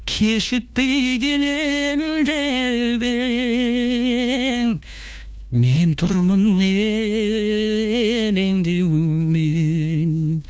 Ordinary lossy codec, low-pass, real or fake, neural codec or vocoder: none; none; fake; codec, 16 kHz, 1 kbps, FunCodec, trained on LibriTTS, 50 frames a second